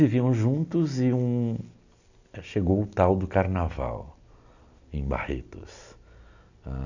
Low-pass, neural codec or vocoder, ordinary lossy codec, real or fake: 7.2 kHz; none; none; real